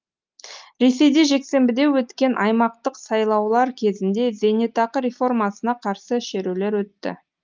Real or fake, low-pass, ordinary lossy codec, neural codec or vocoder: real; 7.2 kHz; Opus, 24 kbps; none